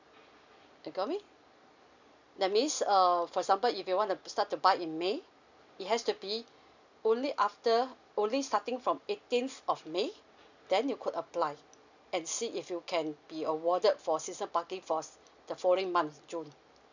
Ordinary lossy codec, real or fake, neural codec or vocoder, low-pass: none; real; none; 7.2 kHz